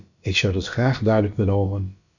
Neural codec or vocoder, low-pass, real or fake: codec, 16 kHz, about 1 kbps, DyCAST, with the encoder's durations; 7.2 kHz; fake